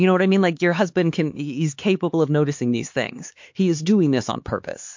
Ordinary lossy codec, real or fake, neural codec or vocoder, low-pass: MP3, 48 kbps; fake; codec, 16 kHz, 4 kbps, X-Codec, HuBERT features, trained on LibriSpeech; 7.2 kHz